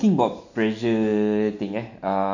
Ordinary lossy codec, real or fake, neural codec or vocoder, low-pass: none; real; none; 7.2 kHz